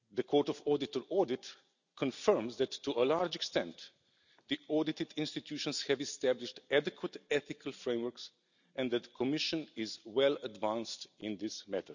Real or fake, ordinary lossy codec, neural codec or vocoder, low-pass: real; none; none; 7.2 kHz